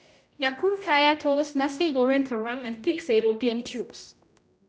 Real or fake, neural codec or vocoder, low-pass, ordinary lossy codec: fake; codec, 16 kHz, 0.5 kbps, X-Codec, HuBERT features, trained on general audio; none; none